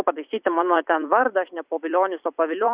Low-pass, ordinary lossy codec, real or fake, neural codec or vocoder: 3.6 kHz; Opus, 64 kbps; fake; vocoder, 44.1 kHz, 128 mel bands every 256 samples, BigVGAN v2